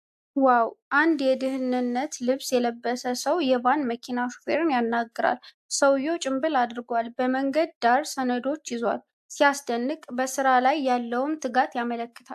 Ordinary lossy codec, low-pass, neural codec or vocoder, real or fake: AAC, 96 kbps; 14.4 kHz; none; real